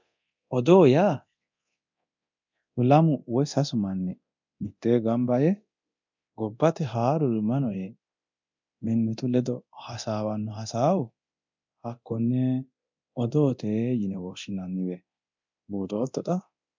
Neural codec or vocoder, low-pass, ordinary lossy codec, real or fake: codec, 24 kHz, 0.9 kbps, DualCodec; 7.2 kHz; MP3, 64 kbps; fake